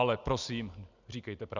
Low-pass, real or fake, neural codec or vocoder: 7.2 kHz; real; none